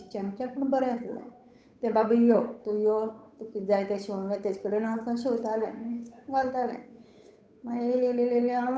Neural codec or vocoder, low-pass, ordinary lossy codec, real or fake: codec, 16 kHz, 8 kbps, FunCodec, trained on Chinese and English, 25 frames a second; none; none; fake